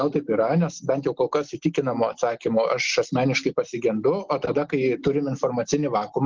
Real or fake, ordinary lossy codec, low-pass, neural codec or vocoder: real; Opus, 16 kbps; 7.2 kHz; none